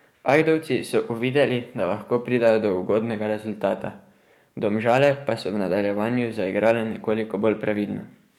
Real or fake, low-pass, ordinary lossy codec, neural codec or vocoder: fake; 19.8 kHz; MP3, 96 kbps; codec, 44.1 kHz, 7.8 kbps, DAC